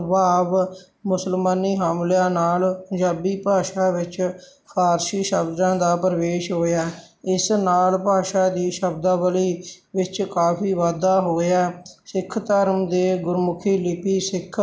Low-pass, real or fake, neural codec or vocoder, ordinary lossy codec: none; real; none; none